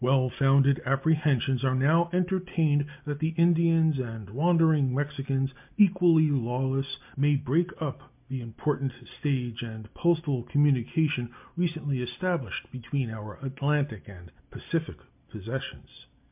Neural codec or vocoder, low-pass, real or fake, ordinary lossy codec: none; 3.6 kHz; real; MP3, 32 kbps